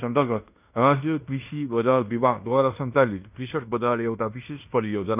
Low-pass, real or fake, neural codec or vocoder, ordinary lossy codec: 3.6 kHz; fake; codec, 16 kHz in and 24 kHz out, 0.9 kbps, LongCat-Audio-Codec, fine tuned four codebook decoder; none